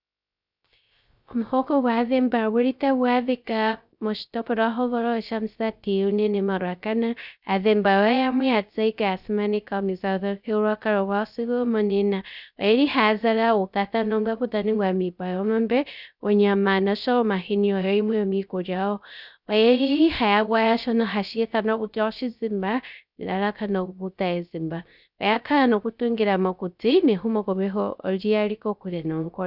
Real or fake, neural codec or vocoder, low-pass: fake; codec, 16 kHz, 0.3 kbps, FocalCodec; 5.4 kHz